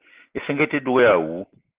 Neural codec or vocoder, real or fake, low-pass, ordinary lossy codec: none; real; 3.6 kHz; Opus, 16 kbps